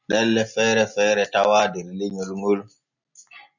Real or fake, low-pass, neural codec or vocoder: real; 7.2 kHz; none